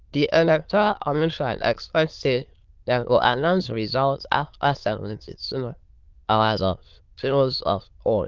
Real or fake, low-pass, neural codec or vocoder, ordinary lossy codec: fake; 7.2 kHz; autoencoder, 22.05 kHz, a latent of 192 numbers a frame, VITS, trained on many speakers; Opus, 32 kbps